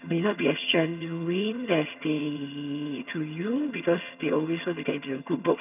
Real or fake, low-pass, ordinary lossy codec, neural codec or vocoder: fake; 3.6 kHz; none; vocoder, 22.05 kHz, 80 mel bands, HiFi-GAN